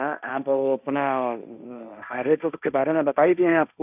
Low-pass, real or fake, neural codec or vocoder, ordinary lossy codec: 3.6 kHz; fake; codec, 16 kHz, 1.1 kbps, Voila-Tokenizer; none